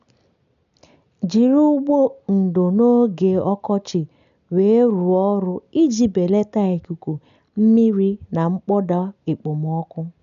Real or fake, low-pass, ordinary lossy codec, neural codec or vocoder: real; 7.2 kHz; none; none